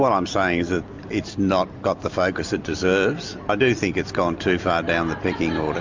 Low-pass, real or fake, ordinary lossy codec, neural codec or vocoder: 7.2 kHz; real; MP3, 64 kbps; none